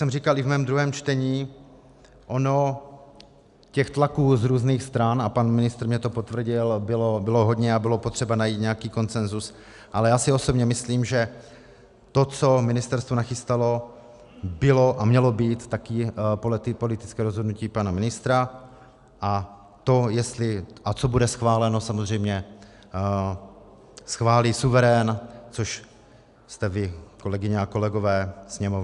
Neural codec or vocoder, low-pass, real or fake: none; 10.8 kHz; real